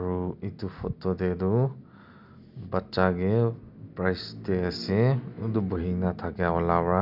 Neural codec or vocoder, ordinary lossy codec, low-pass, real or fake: none; none; 5.4 kHz; real